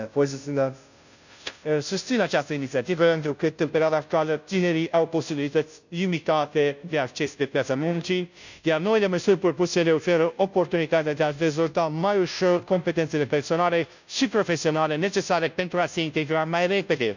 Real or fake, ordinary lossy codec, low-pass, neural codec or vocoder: fake; none; 7.2 kHz; codec, 16 kHz, 0.5 kbps, FunCodec, trained on Chinese and English, 25 frames a second